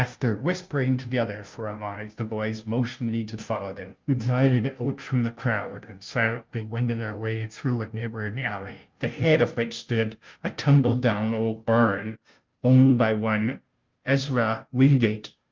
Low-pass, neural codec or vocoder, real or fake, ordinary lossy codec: 7.2 kHz; codec, 16 kHz, 0.5 kbps, FunCodec, trained on Chinese and English, 25 frames a second; fake; Opus, 24 kbps